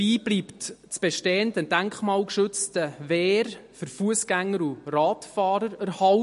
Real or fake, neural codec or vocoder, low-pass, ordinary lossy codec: real; none; 14.4 kHz; MP3, 48 kbps